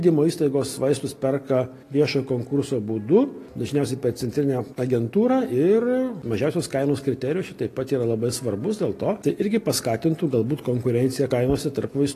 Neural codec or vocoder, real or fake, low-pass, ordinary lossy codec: none; real; 14.4 kHz; AAC, 48 kbps